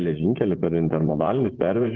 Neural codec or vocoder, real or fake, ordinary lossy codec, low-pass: vocoder, 24 kHz, 100 mel bands, Vocos; fake; Opus, 16 kbps; 7.2 kHz